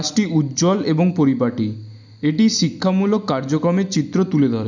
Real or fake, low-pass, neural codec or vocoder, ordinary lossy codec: real; 7.2 kHz; none; none